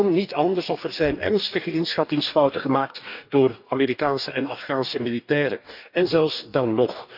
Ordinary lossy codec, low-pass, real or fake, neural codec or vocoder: none; 5.4 kHz; fake; codec, 44.1 kHz, 2.6 kbps, DAC